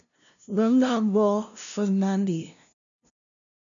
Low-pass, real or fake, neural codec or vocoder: 7.2 kHz; fake; codec, 16 kHz, 0.5 kbps, FunCodec, trained on LibriTTS, 25 frames a second